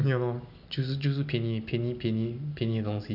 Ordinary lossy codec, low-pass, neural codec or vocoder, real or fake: none; 5.4 kHz; none; real